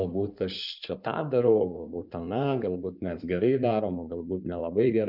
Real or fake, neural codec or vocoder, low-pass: fake; codec, 16 kHz in and 24 kHz out, 2.2 kbps, FireRedTTS-2 codec; 5.4 kHz